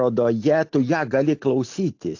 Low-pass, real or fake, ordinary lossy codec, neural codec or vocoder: 7.2 kHz; real; AAC, 48 kbps; none